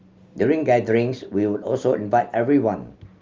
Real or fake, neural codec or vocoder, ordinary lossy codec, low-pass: real; none; Opus, 32 kbps; 7.2 kHz